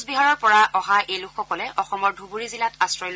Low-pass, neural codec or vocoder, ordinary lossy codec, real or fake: none; none; none; real